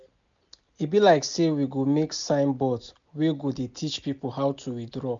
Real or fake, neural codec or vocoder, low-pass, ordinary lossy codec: real; none; 7.2 kHz; MP3, 64 kbps